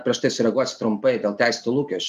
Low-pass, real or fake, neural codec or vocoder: 14.4 kHz; real; none